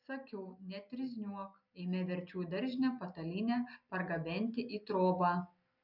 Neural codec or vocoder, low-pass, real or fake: none; 5.4 kHz; real